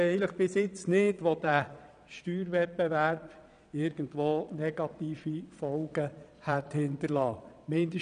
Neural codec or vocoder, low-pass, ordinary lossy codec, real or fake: vocoder, 22.05 kHz, 80 mel bands, Vocos; 9.9 kHz; none; fake